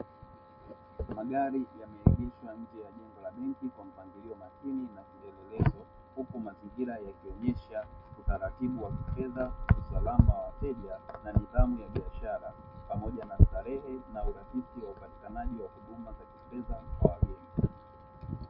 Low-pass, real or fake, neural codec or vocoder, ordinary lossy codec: 5.4 kHz; real; none; MP3, 32 kbps